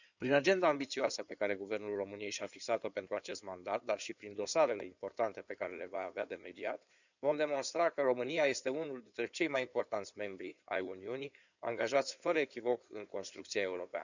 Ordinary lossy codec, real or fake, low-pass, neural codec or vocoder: none; fake; 7.2 kHz; codec, 16 kHz in and 24 kHz out, 2.2 kbps, FireRedTTS-2 codec